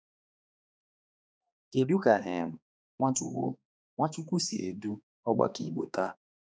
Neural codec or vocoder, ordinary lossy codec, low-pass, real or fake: codec, 16 kHz, 2 kbps, X-Codec, HuBERT features, trained on balanced general audio; none; none; fake